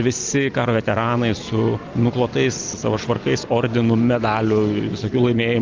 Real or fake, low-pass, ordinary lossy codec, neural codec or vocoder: real; 7.2 kHz; Opus, 24 kbps; none